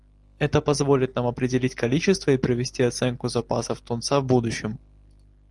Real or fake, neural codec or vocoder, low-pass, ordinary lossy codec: real; none; 10.8 kHz; Opus, 24 kbps